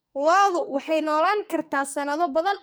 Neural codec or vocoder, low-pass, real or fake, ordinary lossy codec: codec, 44.1 kHz, 2.6 kbps, SNAC; none; fake; none